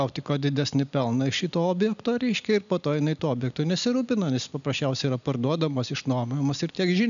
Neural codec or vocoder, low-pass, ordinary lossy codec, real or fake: none; 7.2 kHz; MP3, 96 kbps; real